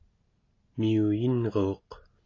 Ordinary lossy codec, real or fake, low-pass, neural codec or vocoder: AAC, 32 kbps; real; 7.2 kHz; none